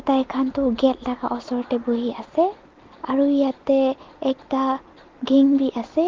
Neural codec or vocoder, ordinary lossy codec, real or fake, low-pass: none; Opus, 16 kbps; real; 7.2 kHz